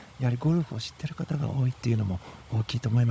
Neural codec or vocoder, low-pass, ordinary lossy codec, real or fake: codec, 16 kHz, 16 kbps, FunCodec, trained on Chinese and English, 50 frames a second; none; none; fake